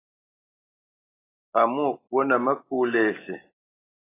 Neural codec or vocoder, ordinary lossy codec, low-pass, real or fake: none; AAC, 16 kbps; 3.6 kHz; real